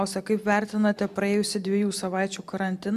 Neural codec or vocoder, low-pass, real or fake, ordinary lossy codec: none; 14.4 kHz; real; MP3, 96 kbps